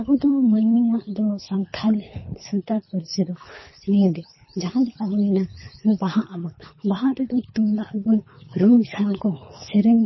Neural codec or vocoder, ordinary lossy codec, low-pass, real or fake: codec, 24 kHz, 3 kbps, HILCodec; MP3, 24 kbps; 7.2 kHz; fake